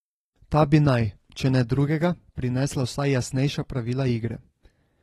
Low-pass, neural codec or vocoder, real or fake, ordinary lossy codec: 19.8 kHz; none; real; AAC, 32 kbps